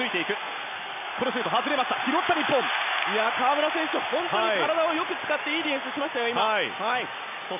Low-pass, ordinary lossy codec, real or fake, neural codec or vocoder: 3.6 kHz; none; real; none